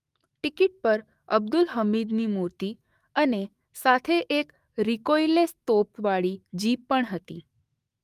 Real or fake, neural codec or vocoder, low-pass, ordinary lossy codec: fake; autoencoder, 48 kHz, 128 numbers a frame, DAC-VAE, trained on Japanese speech; 14.4 kHz; Opus, 32 kbps